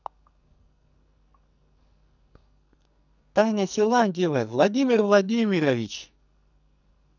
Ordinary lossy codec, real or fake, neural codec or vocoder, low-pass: none; fake; codec, 44.1 kHz, 2.6 kbps, SNAC; 7.2 kHz